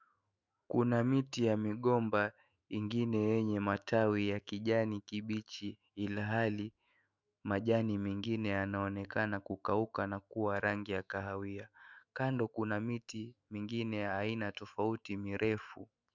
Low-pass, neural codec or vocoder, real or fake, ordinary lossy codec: 7.2 kHz; autoencoder, 48 kHz, 128 numbers a frame, DAC-VAE, trained on Japanese speech; fake; Opus, 64 kbps